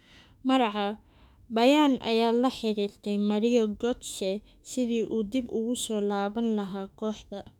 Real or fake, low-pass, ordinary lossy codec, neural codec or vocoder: fake; 19.8 kHz; none; autoencoder, 48 kHz, 32 numbers a frame, DAC-VAE, trained on Japanese speech